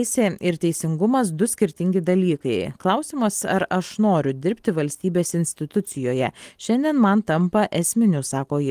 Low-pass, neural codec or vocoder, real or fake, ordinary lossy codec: 14.4 kHz; none; real; Opus, 24 kbps